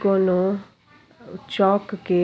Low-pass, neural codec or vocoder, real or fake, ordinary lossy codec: none; none; real; none